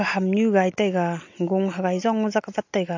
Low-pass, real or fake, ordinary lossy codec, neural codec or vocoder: 7.2 kHz; real; AAC, 48 kbps; none